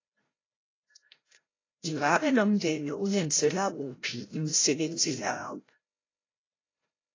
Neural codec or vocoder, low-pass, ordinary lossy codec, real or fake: codec, 16 kHz, 0.5 kbps, FreqCodec, larger model; 7.2 kHz; AAC, 32 kbps; fake